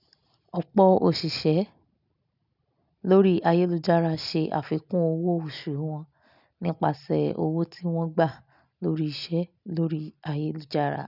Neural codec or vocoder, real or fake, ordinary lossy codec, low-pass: none; real; none; 5.4 kHz